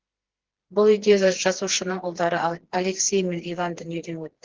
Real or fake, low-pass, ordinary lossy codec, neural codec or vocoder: fake; 7.2 kHz; Opus, 16 kbps; codec, 16 kHz, 2 kbps, FreqCodec, smaller model